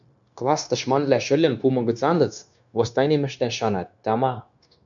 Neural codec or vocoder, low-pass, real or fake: codec, 16 kHz, 0.9 kbps, LongCat-Audio-Codec; 7.2 kHz; fake